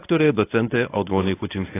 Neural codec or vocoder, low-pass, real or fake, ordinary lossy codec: codec, 24 kHz, 0.9 kbps, WavTokenizer, medium speech release version 1; 3.6 kHz; fake; AAC, 16 kbps